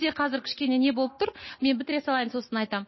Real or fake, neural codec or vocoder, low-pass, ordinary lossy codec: real; none; 7.2 kHz; MP3, 24 kbps